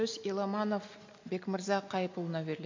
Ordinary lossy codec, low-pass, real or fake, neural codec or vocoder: MP3, 48 kbps; 7.2 kHz; real; none